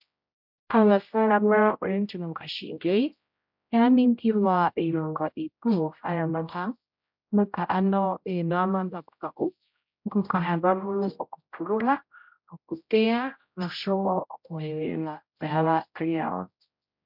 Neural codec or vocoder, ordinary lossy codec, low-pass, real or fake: codec, 16 kHz, 0.5 kbps, X-Codec, HuBERT features, trained on general audio; MP3, 48 kbps; 5.4 kHz; fake